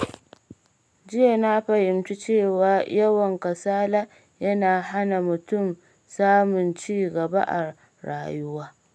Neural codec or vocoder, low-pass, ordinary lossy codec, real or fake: none; none; none; real